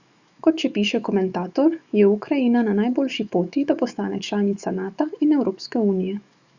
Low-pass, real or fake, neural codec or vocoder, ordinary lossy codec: 7.2 kHz; fake; autoencoder, 48 kHz, 128 numbers a frame, DAC-VAE, trained on Japanese speech; Opus, 64 kbps